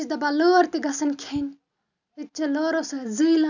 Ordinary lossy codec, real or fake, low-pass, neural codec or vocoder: none; real; 7.2 kHz; none